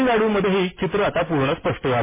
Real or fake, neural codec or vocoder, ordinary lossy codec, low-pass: real; none; MP3, 16 kbps; 3.6 kHz